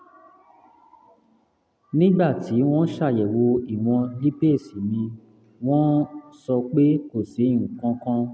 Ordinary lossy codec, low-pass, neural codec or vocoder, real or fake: none; none; none; real